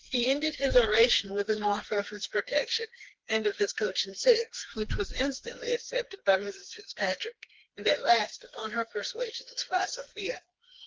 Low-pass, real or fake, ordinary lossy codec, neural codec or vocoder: 7.2 kHz; fake; Opus, 16 kbps; codec, 16 kHz, 2 kbps, FreqCodec, smaller model